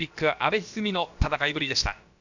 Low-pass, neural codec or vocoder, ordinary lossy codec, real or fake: 7.2 kHz; codec, 16 kHz, about 1 kbps, DyCAST, with the encoder's durations; none; fake